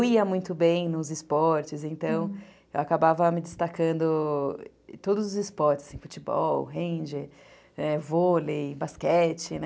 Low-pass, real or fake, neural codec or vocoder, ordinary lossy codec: none; real; none; none